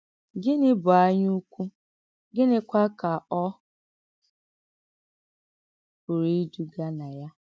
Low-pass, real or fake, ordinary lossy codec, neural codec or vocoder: none; real; none; none